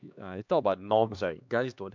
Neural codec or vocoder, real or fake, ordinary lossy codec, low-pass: codec, 16 kHz, 2 kbps, X-Codec, HuBERT features, trained on LibriSpeech; fake; MP3, 64 kbps; 7.2 kHz